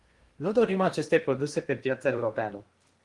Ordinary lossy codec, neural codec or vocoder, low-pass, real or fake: Opus, 24 kbps; codec, 16 kHz in and 24 kHz out, 0.8 kbps, FocalCodec, streaming, 65536 codes; 10.8 kHz; fake